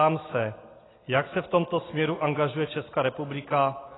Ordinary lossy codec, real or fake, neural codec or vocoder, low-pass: AAC, 16 kbps; real; none; 7.2 kHz